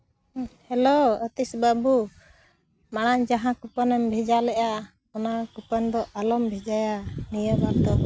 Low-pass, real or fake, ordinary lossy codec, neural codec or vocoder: none; real; none; none